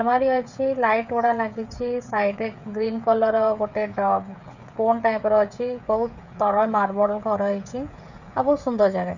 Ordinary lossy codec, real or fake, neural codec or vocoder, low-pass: none; fake; codec, 16 kHz, 16 kbps, FreqCodec, smaller model; 7.2 kHz